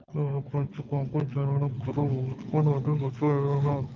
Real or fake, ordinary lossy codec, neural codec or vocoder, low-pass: fake; Opus, 24 kbps; codec, 24 kHz, 6 kbps, HILCodec; 7.2 kHz